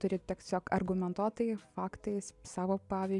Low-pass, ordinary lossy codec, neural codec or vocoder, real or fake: 10.8 kHz; MP3, 96 kbps; none; real